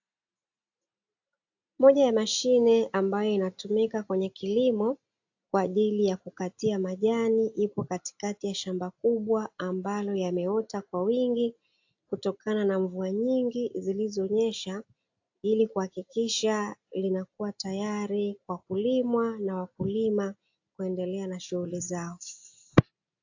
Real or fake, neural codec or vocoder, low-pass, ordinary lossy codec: real; none; 7.2 kHz; AAC, 48 kbps